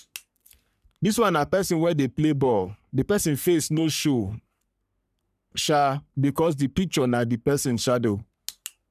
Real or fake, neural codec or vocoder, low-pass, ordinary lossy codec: fake; codec, 44.1 kHz, 3.4 kbps, Pupu-Codec; 14.4 kHz; none